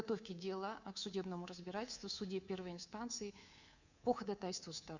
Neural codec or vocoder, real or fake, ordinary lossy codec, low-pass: codec, 24 kHz, 3.1 kbps, DualCodec; fake; Opus, 64 kbps; 7.2 kHz